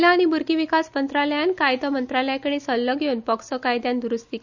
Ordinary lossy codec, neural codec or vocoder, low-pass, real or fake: none; none; 7.2 kHz; real